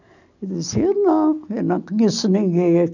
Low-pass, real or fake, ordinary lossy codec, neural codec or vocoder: 7.2 kHz; real; none; none